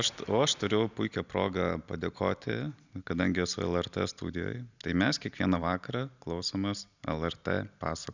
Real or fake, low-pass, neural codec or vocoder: real; 7.2 kHz; none